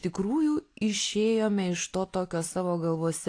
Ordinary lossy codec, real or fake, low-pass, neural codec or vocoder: AAC, 48 kbps; real; 9.9 kHz; none